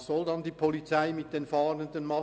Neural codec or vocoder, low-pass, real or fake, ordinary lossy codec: none; none; real; none